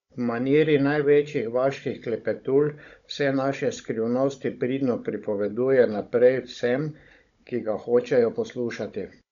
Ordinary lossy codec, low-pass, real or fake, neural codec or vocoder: none; 7.2 kHz; fake; codec, 16 kHz, 16 kbps, FunCodec, trained on Chinese and English, 50 frames a second